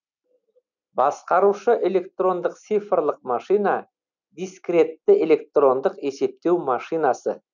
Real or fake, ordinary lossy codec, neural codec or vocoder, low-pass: real; none; none; 7.2 kHz